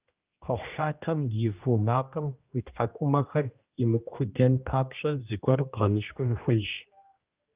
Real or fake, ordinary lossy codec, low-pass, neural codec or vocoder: fake; Opus, 24 kbps; 3.6 kHz; codec, 16 kHz, 1 kbps, X-Codec, HuBERT features, trained on general audio